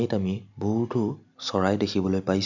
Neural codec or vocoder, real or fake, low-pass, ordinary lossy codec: none; real; 7.2 kHz; none